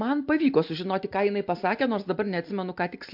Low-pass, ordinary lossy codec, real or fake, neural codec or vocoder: 5.4 kHz; MP3, 48 kbps; real; none